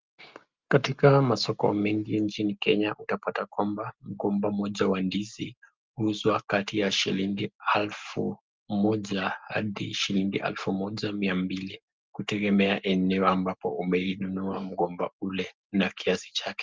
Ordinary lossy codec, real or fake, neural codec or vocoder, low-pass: Opus, 24 kbps; real; none; 7.2 kHz